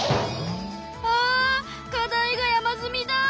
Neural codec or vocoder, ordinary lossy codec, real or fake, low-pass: none; none; real; none